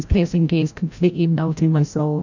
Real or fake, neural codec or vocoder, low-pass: fake; codec, 16 kHz, 0.5 kbps, FreqCodec, larger model; 7.2 kHz